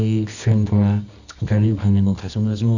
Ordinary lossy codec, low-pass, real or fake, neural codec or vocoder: none; 7.2 kHz; fake; codec, 24 kHz, 0.9 kbps, WavTokenizer, medium music audio release